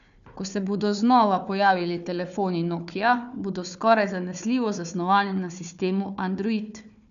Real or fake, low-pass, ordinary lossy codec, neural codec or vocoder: fake; 7.2 kHz; none; codec, 16 kHz, 4 kbps, FunCodec, trained on Chinese and English, 50 frames a second